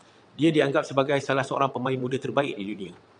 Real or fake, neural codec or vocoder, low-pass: fake; vocoder, 22.05 kHz, 80 mel bands, WaveNeXt; 9.9 kHz